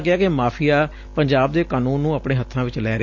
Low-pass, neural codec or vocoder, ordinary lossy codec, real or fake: 7.2 kHz; none; MP3, 48 kbps; real